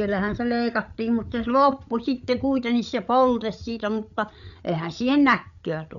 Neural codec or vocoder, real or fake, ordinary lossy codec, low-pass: codec, 16 kHz, 8 kbps, FreqCodec, larger model; fake; none; 7.2 kHz